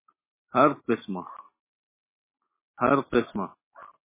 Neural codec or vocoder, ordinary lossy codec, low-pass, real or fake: none; MP3, 16 kbps; 3.6 kHz; real